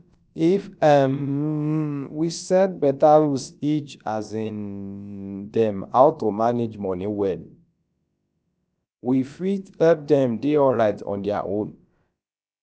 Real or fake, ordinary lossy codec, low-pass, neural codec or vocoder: fake; none; none; codec, 16 kHz, about 1 kbps, DyCAST, with the encoder's durations